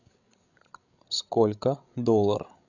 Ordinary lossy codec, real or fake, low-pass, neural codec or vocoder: none; fake; 7.2 kHz; codec, 16 kHz, 8 kbps, FreqCodec, larger model